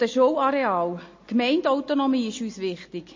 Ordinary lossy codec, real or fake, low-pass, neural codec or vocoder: MP3, 32 kbps; real; 7.2 kHz; none